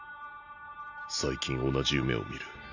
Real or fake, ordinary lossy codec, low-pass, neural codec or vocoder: real; none; 7.2 kHz; none